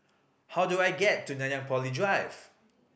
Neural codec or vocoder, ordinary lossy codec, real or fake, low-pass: none; none; real; none